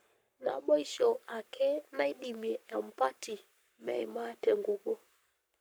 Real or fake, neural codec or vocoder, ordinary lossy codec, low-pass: fake; codec, 44.1 kHz, 7.8 kbps, Pupu-Codec; none; none